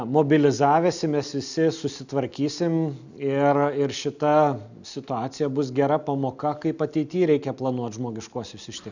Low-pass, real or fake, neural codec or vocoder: 7.2 kHz; real; none